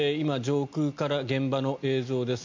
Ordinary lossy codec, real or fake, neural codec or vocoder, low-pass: MP3, 48 kbps; real; none; 7.2 kHz